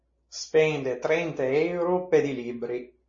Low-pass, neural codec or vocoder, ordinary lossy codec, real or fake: 7.2 kHz; none; MP3, 32 kbps; real